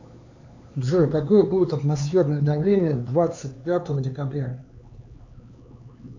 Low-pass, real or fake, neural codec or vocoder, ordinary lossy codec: 7.2 kHz; fake; codec, 16 kHz, 4 kbps, X-Codec, HuBERT features, trained on LibriSpeech; AAC, 48 kbps